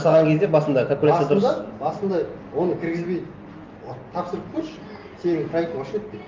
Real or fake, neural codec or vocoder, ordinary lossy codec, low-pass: real; none; Opus, 24 kbps; 7.2 kHz